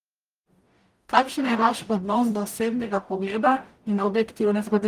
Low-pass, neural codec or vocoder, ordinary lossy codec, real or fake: 14.4 kHz; codec, 44.1 kHz, 0.9 kbps, DAC; Opus, 32 kbps; fake